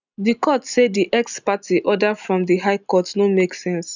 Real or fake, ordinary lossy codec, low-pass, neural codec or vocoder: real; none; 7.2 kHz; none